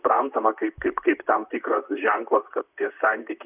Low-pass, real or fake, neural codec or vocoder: 3.6 kHz; fake; vocoder, 44.1 kHz, 128 mel bands, Pupu-Vocoder